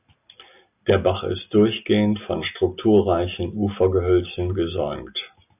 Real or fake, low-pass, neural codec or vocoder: real; 3.6 kHz; none